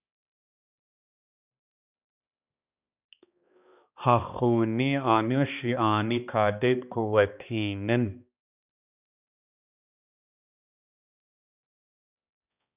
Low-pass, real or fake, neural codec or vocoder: 3.6 kHz; fake; codec, 16 kHz, 2 kbps, X-Codec, HuBERT features, trained on balanced general audio